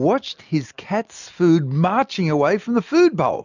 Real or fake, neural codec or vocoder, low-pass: real; none; 7.2 kHz